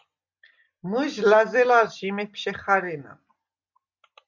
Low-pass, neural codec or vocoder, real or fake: 7.2 kHz; none; real